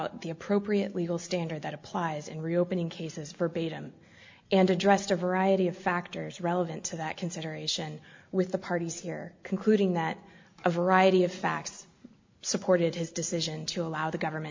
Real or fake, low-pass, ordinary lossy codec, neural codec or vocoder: real; 7.2 kHz; MP3, 64 kbps; none